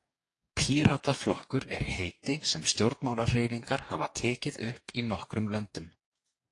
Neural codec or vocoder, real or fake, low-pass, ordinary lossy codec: codec, 44.1 kHz, 2.6 kbps, DAC; fake; 10.8 kHz; AAC, 48 kbps